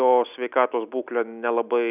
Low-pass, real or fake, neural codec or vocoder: 3.6 kHz; real; none